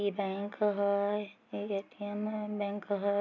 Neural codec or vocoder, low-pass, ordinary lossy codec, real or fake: none; 7.2 kHz; none; real